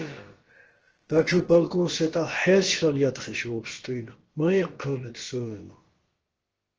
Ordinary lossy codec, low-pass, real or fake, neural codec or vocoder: Opus, 16 kbps; 7.2 kHz; fake; codec, 16 kHz, about 1 kbps, DyCAST, with the encoder's durations